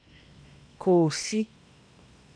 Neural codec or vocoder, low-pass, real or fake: codec, 16 kHz in and 24 kHz out, 0.8 kbps, FocalCodec, streaming, 65536 codes; 9.9 kHz; fake